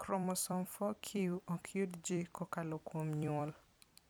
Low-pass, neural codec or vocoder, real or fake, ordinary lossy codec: none; vocoder, 44.1 kHz, 128 mel bands every 256 samples, BigVGAN v2; fake; none